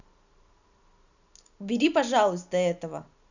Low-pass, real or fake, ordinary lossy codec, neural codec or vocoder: 7.2 kHz; real; none; none